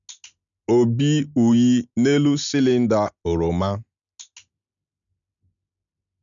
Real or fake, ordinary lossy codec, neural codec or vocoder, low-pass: real; none; none; 7.2 kHz